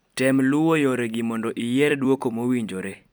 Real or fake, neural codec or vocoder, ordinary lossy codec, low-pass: fake; vocoder, 44.1 kHz, 128 mel bands every 256 samples, BigVGAN v2; none; none